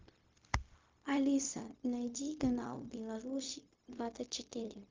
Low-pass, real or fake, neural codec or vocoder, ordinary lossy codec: 7.2 kHz; fake; codec, 16 kHz, 0.4 kbps, LongCat-Audio-Codec; Opus, 24 kbps